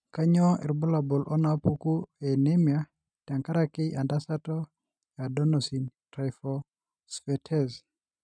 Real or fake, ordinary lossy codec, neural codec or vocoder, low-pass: real; none; none; 9.9 kHz